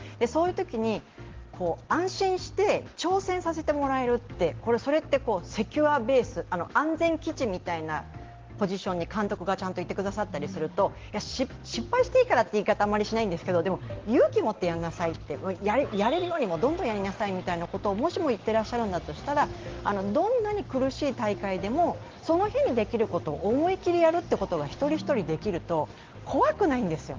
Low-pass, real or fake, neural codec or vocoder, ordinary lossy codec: 7.2 kHz; real; none; Opus, 16 kbps